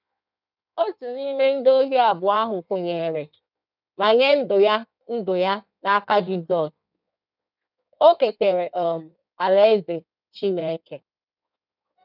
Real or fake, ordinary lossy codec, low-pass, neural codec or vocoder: fake; none; 5.4 kHz; codec, 16 kHz in and 24 kHz out, 1.1 kbps, FireRedTTS-2 codec